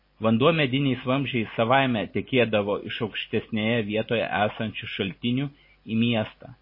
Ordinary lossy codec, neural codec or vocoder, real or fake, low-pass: MP3, 24 kbps; none; real; 5.4 kHz